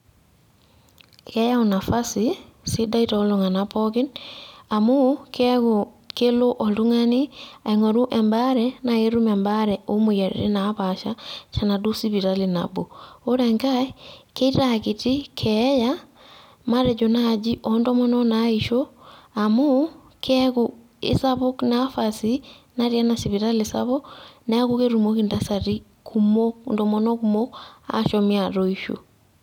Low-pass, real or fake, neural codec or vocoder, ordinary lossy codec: 19.8 kHz; real; none; none